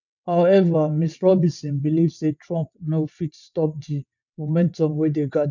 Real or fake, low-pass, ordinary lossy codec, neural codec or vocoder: fake; 7.2 kHz; none; vocoder, 22.05 kHz, 80 mel bands, WaveNeXt